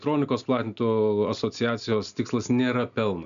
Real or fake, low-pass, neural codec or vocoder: real; 7.2 kHz; none